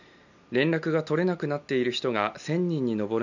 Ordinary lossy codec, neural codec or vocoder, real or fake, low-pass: none; none; real; 7.2 kHz